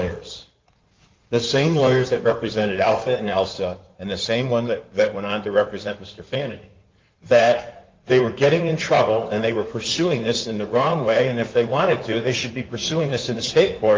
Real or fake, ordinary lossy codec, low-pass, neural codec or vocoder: fake; Opus, 32 kbps; 7.2 kHz; codec, 16 kHz in and 24 kHz out, 2.2 kbps, FireRedTTS-2 codec